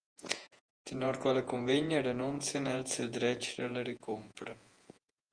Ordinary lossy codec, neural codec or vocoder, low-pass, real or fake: Opus, 32 kbps; vocoder, 48 kHz, 128 mel bands, Vocos; 9.9 kHz; fake